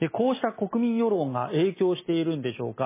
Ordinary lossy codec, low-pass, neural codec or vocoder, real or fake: MP3, 16 kbps; 3.6 kHz; none; real